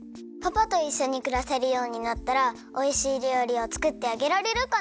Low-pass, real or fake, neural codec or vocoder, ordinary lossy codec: none; real; none; none